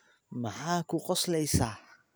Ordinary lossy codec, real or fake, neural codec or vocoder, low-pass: none; real; none; none